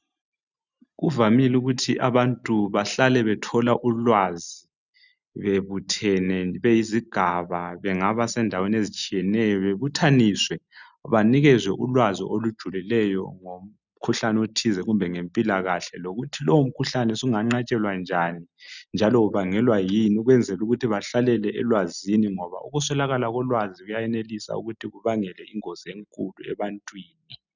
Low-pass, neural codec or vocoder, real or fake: 7.2 kHz; none; real